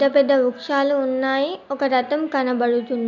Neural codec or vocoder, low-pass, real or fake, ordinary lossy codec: none; 7.2 kHz; real; none